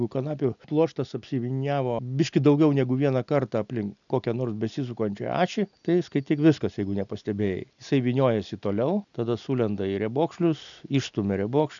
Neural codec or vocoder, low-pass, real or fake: none; 7.2 kHz; real